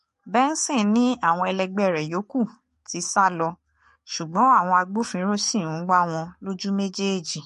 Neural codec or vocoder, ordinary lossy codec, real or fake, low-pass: codec, 44.1 kHz, 7.8 kbps, DAC; MP3, 48 kbps; fake; 14.4 kHz